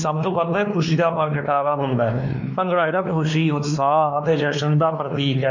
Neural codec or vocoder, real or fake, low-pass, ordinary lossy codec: codec, 16 kHz, 2 kbps, X-Codec, WavLM features, trained on Multilingual LibriSpeech; fake; 7.2 kHz; none